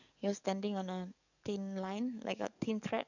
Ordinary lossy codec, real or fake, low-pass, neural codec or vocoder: none; fake; 7.2 kHz; codec, 44.1 kHz, 7.8 kbps, DAC